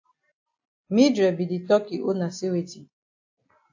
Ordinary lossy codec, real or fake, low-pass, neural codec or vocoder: AAC, 48 kbps; real; 7.2 kHz; none